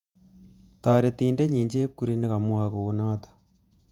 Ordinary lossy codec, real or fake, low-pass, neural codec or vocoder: none; fake; 19.8 kHz; vocoder, 48 kHz, 128 mel bands, Vocos